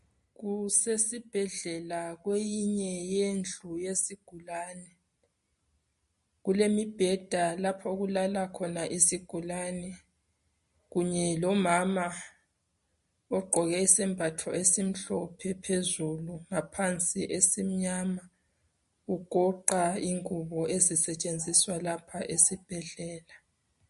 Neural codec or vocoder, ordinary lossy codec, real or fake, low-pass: none; MP3, 48 kbps; real; 14.4 kHz